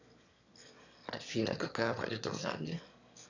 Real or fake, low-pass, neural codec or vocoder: fake; 7.2 kHz; autoencoder, 22.05 kHz, a latent of 192 numbers a frame, VITS, trained on one speaker